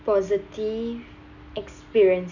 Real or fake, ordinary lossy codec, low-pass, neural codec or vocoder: real; none; 7.2 kHz; none